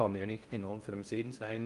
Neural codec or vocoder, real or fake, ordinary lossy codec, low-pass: codec, 16 kHz in and 24 kHz out, 0.6 kbps, FocalCodec, streaming, 4096 codes; fake; Opus, 32 kbps; 10.8 kHz